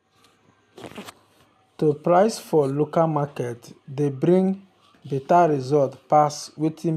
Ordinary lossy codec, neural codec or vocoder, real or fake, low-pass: none; none; real; 14.4 kHz